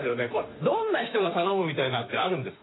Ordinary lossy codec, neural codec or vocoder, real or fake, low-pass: AAC, 16 kbps; autoencoder, 48 kHz, 32 numbers a frame, DAC-VAE, trained on Japanese speech; fake; 7.2 kHz